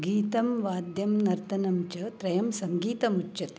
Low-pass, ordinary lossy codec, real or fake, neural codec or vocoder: none; none; real; none